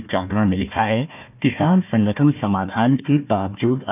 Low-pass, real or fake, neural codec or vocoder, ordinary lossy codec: 3.6 kHz; fake; codec, 16 kHz, 1 kbps, FunCodec, trained on Chinese and English, 50 frames a second; none